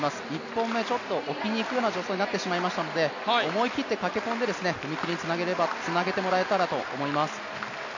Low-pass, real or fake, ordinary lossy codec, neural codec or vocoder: 7.2 kHz; real; none; none